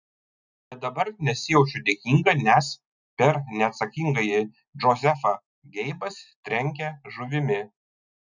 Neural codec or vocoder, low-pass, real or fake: none; 7.2 kHz; real